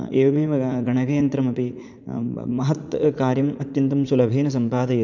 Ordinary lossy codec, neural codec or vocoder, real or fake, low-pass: none; vocoder, 44.1 kHz, 80 mel bands, Vocos; fake; 7.2 kHz